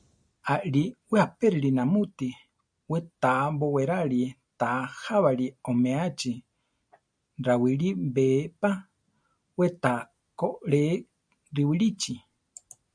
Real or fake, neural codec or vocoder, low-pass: real; none; 9.9 kHz